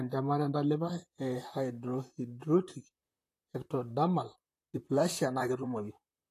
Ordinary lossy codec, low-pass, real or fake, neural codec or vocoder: AAC, 48 kbps; 14.4 kHz; fake; vocoder, 44.1 kHz, 128 mel bands, Pupu-Vocoder